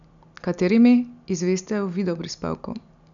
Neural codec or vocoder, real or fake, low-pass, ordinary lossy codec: none; real; 7.2 kHz; none